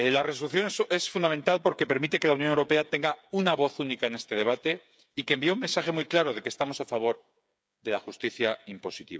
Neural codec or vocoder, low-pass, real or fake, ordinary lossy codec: codec, 16 kHz, 8 kbps, FreqCodec, smaller model; none; fake; none